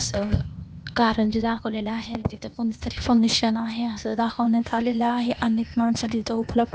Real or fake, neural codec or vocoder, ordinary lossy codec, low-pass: fake; codec, 16 kHz, 0.8 kbps, ZipCodec; none; none